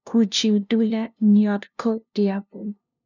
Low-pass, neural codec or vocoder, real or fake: 7.2 kHz; codec, 16 kHz, 0.5 kbps, FunCodec, trained on LibriTTS, 25 frames a second; fake